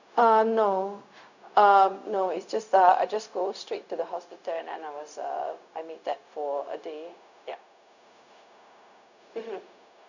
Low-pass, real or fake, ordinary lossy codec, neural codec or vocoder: 7.2 kHz; fake; none; codec, 16 kHz, 0.4 kbps, LongCat-Audio-Codec